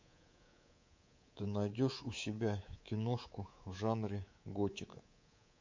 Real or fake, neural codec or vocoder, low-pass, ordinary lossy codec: fake; codec, 24 kHz, 3.1 kbps, DualCodec; 7.2 kHz; MP3, 48 kbps